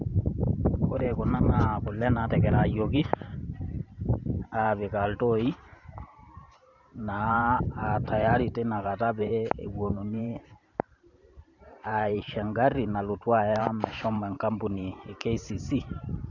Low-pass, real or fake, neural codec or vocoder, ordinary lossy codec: 7.2 kHz; fake; vocoder, 44.1 kHz, 128 mel bands every 512 samples, BigVGAN v2; none